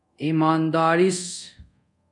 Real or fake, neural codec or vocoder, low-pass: fake; codec, 24 kHz, 0.5 kbps, DualCodec; 10.8 kHz